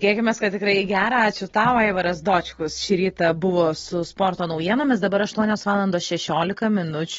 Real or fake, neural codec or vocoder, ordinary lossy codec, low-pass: real; none; AAC, 24 kbps; 7.2 kHz